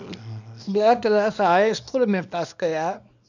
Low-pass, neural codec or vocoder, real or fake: 7.2 kHz; codec, 24 kHz, 0.9 kbps, WavTokenizer, small release; fake